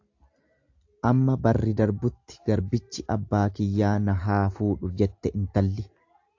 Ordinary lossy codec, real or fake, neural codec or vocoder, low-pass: AAC, 48 kbps; real; none; 7.2 kHz